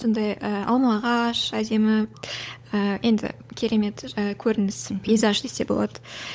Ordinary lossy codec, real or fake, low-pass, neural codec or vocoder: none; fake; none; codec, 16 kHz, 16 kbps, FunCodec, trained on LibriTTS, 50 frames a second